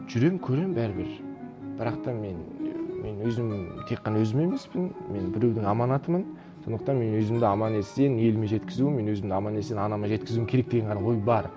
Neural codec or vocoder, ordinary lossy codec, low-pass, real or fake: none; none; none; real